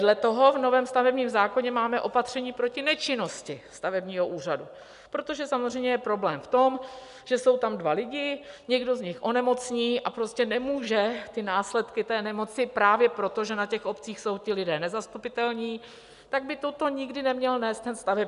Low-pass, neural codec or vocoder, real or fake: 10.8 kHz; none; real